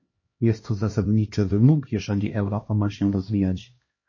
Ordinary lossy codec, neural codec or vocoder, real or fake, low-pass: MP3, 32 kbps; codec, 16 kHz, 1 kbps, X-Codec, HuBERT features, trained on LibriSpeech; fake; 7.2 kHz